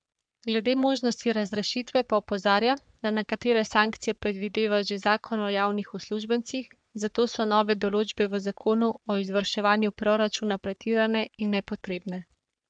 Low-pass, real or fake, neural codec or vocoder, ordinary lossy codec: 9.9 kHz; fake; codec, 44.1 kHz, 3.4 kbps, Pupu-Codec; none